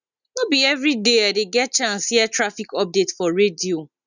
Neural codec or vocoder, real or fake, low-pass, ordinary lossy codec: none; real; 7.2 kHz; none